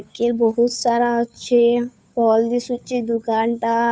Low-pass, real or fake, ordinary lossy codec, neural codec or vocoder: none; fake; none; codec, 16 kHz, 2 kbps, FunCodec, trained on Chinese and English, 25 frames a second